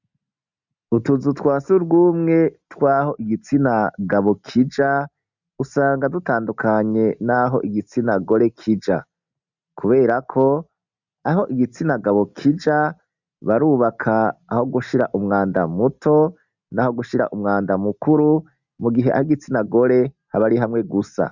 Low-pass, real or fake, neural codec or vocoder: 7.2 kHz; real; none